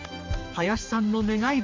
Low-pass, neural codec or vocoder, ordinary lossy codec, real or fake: 7.2 kHz; codec, 16 kHz, 2 kbps, X-Codec, HuBERT features, trained on general audio; MP3, 48 kbps; fake